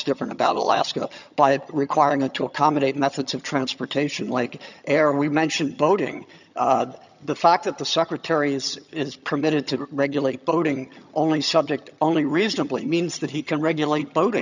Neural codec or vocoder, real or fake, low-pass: vocoder, 22.05 kHz, 80 mel bands, HiFi-GAN; fake; 7.2 kHz